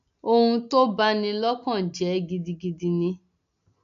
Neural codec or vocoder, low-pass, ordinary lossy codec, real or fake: none; 7.2 kHz; none; real